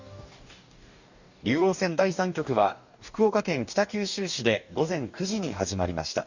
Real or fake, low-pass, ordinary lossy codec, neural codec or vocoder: fake; 7.2 kHz; none; codec, 44.1 kHz, 2.6 kbps, DAC